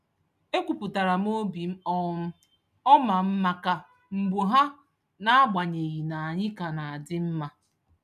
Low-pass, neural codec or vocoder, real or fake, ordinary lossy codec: 14.4 kHz; none; real; none